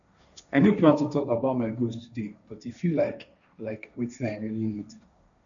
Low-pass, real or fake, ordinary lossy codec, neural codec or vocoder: 7.2 kHz; fake; none; codec, 16 kHz, 1.1 kbps, Voila-Tokenizer